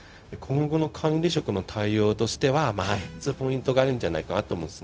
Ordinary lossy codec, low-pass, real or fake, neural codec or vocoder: none; none; fake; codec, 16 kHz, 0.4 kbps, LongCat-Audio-Codec